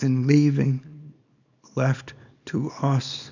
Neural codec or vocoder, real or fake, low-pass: codec, 24 kHz, 0.9 kbps, WavTokenizer, small release; fake; 7.2 kHz